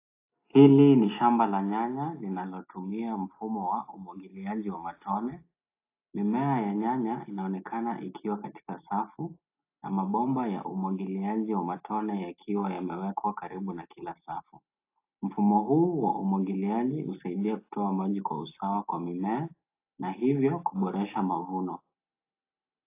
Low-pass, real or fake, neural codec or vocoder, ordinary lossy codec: 3.6 kHz; real; none; AAC, 24 kbps